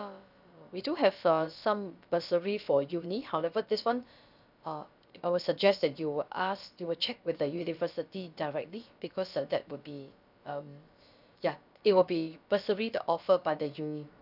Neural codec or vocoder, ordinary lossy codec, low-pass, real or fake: codec, 16 kHz, about 1 kbps, DyCAST, with the encoder's durations; none; 5.4 kHz; fake